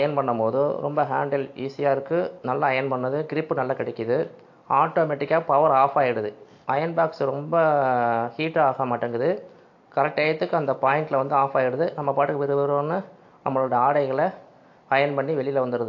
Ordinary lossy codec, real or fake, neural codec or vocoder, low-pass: AAC, 48 kbps; real; none; 7.2 kHz